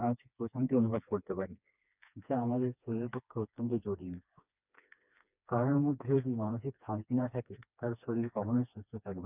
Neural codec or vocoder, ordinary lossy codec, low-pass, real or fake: codec, 16 kHz, 2 kbps, FreqCodec, smaller model; none; 3.6 kHz; fake